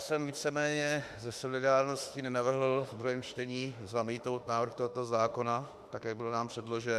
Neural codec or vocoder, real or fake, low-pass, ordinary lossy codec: autoencoder, 48 kHz, 32 numbers a frame, DAC-VAE, trained on Japanese speech; fake; 14.4 kHz; Opus, 64 kbps